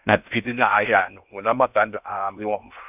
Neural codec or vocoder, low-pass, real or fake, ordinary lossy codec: codec, 16 kHz in and 24 kHz out, 0.6 kbps, FocalCodec, streaming, 4096 codes; 3.6 kHz; fake; none